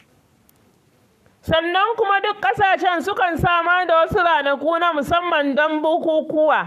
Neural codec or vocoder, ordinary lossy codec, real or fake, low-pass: codec, 44.1 kHz, 7.8 kbps, Pupu-Codec; none; fake; 14.4 kHz